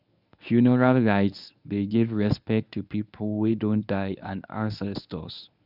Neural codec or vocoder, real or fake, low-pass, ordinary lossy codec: codec, 24 kHz, 0.9 kbps, WavTokenizer, medium speech release version 1; fake; 5.4 kHz; none